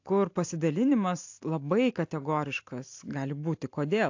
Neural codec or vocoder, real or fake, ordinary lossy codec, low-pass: none; real; AAC, 48 kbps; 7.2 kHz